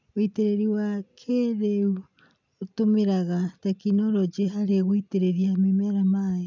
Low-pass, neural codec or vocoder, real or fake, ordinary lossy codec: 7.2 kHz; none; real; none